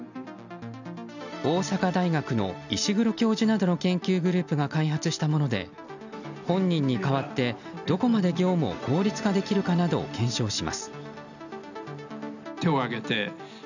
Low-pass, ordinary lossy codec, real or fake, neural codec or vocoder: 7.2 kHz; none; real; none